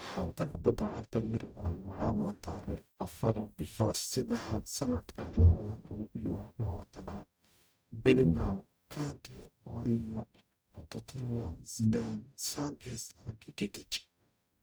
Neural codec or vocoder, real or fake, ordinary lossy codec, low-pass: codec, 44.1 kHz, 0.9 kbps, DAC; fake; none; none